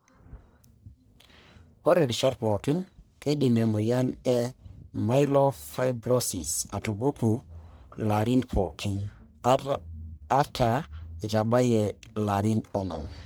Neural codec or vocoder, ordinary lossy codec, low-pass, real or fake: codec, 44.1 kHz, 1.7 kbps, Pupu-Codec; none; none; fake